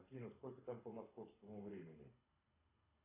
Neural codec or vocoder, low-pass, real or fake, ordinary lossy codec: codec, 24 kHz, 6 kbps, HILCodec; 3.6 kHz; fake; AAC, 16 kbps